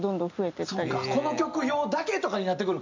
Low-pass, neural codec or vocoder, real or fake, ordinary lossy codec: 7.2 kHz; none; real; MP3, 64 kbps